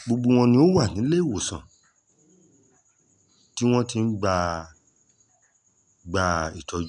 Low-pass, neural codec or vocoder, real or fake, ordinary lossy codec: 10.8 kHz; none; real; none